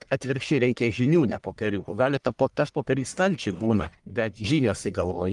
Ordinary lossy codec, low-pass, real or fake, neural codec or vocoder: Opus, 32 kbps; 10.8 kHz; fake; codec, 44.1 kHz, 1.7 kbps, Pupu-Codec